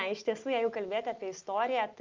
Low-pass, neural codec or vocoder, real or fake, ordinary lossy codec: 7.2 kHz; none; real; Opus, 24 kbps